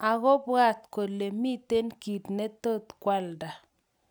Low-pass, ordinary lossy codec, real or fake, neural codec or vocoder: none; none; real; none